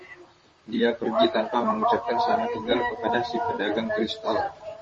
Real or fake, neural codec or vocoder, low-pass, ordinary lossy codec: fake; vocoder, 44.1 kHz, 128 mel bands, Pupu-Vocoder; 10.8 kHz; MP3, 32 kbps